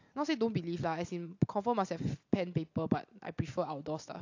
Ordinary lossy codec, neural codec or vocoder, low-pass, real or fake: AAC, 48 kbps; none; 7.2 kHz; real